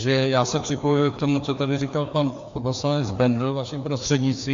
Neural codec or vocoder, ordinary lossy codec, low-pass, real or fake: codec, 16 kHz, 2 kbps, FreqCodec, larger model; AAC, 64 kbps; 7.2 kHz; fake